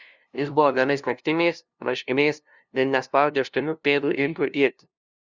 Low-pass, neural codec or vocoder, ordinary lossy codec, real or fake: 7.2 kHz; codec, 16 kHz, 0.5 kbps, FunCodec, trained on LibriTTS, 25 frames a second; Opus, 64 kbps; fake